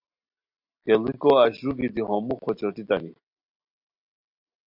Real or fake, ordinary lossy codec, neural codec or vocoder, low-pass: real; MP3, 48 kbps; none; 5.4 kHz